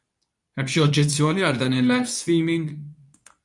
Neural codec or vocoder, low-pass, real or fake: codec, 24 kHz, 0.9 kbps, WavTokenizer, medium speech release version 2; 10.8 kHz; fake